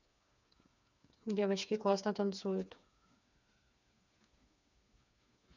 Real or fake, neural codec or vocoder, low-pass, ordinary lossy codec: fake; codec, 16 kHz, 4 kbps, FreqCodec, smaller model; 7.2 kHz; none